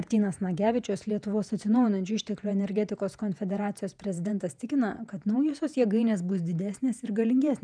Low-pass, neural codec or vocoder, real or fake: 9.9 kHz; vocoder, 48 kHz, 128 mel bands, Vocos; fake